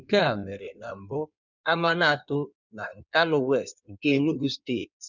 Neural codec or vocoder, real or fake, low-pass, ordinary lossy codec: codec, 16 kHz in and 24 kHz out, 1.1 kbps, FireRedTTS-2 codec; fake; 7.2 kHz; none